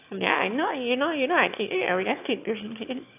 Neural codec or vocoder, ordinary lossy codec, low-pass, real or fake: autoencoder, 22.05 kHz, a latent of 192 numbers a frame, VITS, trained on one speaker; AAC, 32 kbps; 3.6 kHz; fake